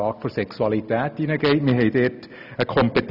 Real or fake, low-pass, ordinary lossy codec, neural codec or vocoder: real; 5.4 kHz; none; none